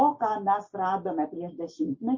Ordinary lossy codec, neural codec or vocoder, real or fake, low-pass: MP3, 32 kbps; none; real; 7.2 kHz